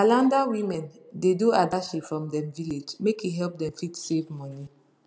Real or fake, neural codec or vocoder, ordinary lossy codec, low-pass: real; none; none; none